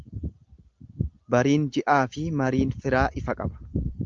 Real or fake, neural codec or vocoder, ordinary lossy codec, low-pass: real; none; Opus, 24 kbps; 7.2 kHz